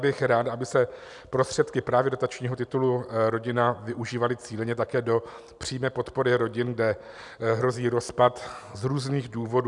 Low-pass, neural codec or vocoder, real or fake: 10.8 kHz; none; real